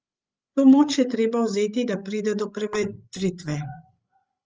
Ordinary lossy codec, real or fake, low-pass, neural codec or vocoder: Opus, 24 kbps; fake; 7.2 kHz; codec, 16 kHz, 16 kbps, FreqCodec, larger model